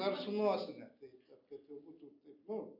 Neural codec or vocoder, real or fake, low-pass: none; real; 5.4 kHz